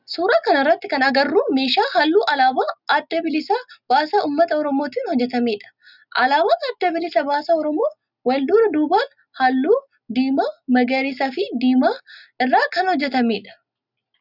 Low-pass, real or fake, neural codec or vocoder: 5.4 kHz; real; none